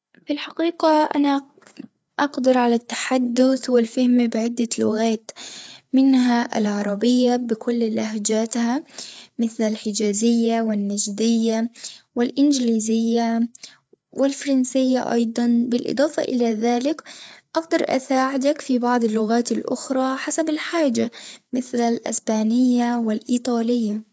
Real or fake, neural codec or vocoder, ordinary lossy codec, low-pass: fake; codec, 16 kHz, 4 kbps, FreqCodec, larger model; none; none